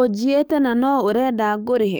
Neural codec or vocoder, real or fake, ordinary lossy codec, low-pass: codec, 44.1 kHz, 7.8 kbps, DAC; fake; none; none